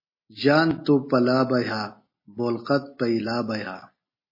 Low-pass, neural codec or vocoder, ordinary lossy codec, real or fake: 5.4 kHz; none; MP3, 24 kbps; real